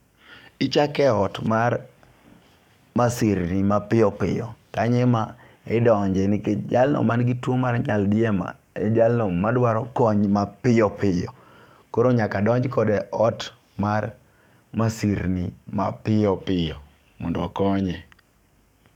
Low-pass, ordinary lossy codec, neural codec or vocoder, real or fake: 19.8 kHz; none; codec, 44.1 kHz, 7.8 kbps, DAC; fake